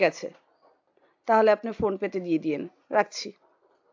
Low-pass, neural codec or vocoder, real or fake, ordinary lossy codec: 7.2 kHz; none; real; AAC, 48 kbps